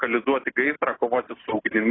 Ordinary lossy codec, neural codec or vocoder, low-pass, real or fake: AAC, 16 kbps; none; 7.2 kHz; real